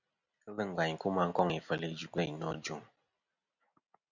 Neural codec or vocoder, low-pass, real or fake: none; 7.2 kHz; real